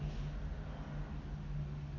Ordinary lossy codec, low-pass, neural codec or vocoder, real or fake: none; 7.2 kHz; none; real